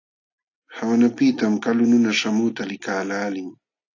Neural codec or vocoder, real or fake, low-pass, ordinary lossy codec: none; real; 7.2 kHz; AAC, 48 kbps